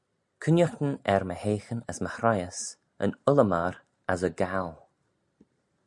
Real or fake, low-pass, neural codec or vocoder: real; 10.8 kHz; none